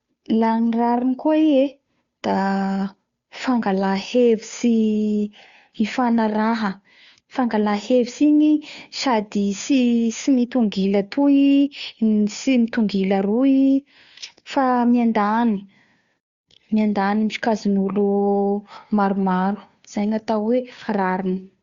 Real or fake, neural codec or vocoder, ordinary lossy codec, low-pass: fake; codec, 16 kHz, 2 kbps, FunCodec, trained on Chinese and English, 25 frames a second; Opus, 64 kbps; 7.2 kHz